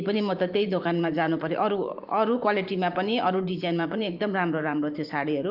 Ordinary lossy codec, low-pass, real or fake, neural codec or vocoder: Opus, 32 kbps; 5.4 kHz; fake; vocoder, 44.1 kHz, 128 mel bands every 512 samples, BigVGAN v2